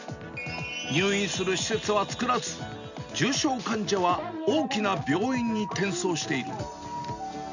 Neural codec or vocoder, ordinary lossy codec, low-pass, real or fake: none; none; 7.2 kHz; real